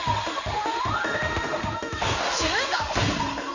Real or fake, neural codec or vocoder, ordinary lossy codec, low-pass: fake; codec, 16 kHz in and 24 kHz out, 1 kbps, XY-Tokenizer; none; 7.2 kHz